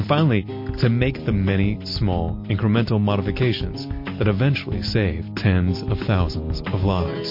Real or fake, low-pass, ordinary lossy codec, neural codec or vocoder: real; 5.4 kHz; MP3, 32 kbps; none